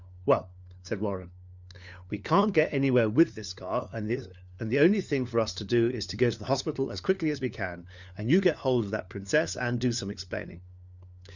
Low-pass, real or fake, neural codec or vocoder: 7.2 kHz; fake; codec, 16 kHz, 4 kbps, FunCodec, trained on LibriTTS, 50 frames a second